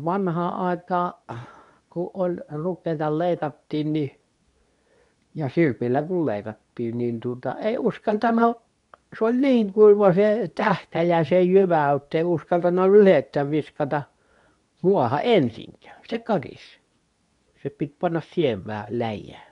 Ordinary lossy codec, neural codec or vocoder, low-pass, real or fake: none; codec, 24 kHz, 0.9 kbps, WavTokenizer, medium speech release version 2; 10.8 kHz; fake